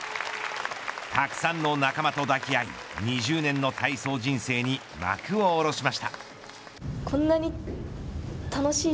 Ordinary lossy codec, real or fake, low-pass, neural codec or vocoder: none; real; none; none